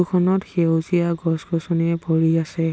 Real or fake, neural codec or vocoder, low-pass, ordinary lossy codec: real; none; none; none